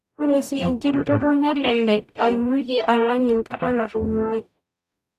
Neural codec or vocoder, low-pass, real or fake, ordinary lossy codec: codec, 44.1 kHz, 0.9 kbps, DAC; 14.4 kHz; fake; none